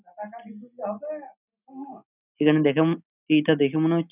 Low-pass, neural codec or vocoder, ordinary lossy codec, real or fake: 3.6 kHz; none; none; real